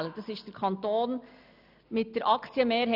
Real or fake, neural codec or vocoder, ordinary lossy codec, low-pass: real; none; none; 5.4 kHz